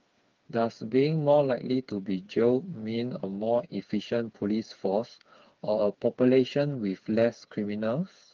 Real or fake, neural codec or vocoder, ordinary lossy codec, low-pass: fake; codec, 16 kHz, 4 kbps, FreqCodec, smaller model; Opus, 32 kbps; 7.2 kHz